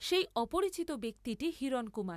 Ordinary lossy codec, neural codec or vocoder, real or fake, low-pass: AAC, 96 kbps; none; real; 14.4 kHz